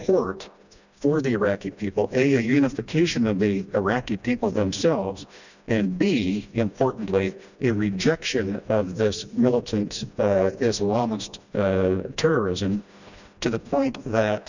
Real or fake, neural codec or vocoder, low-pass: fake; codec, 16 kHz, 1 kbps, FreqCodec, smaller model; 7.2 kHz